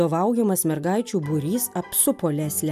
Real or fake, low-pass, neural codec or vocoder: real; 14.4 kHz; none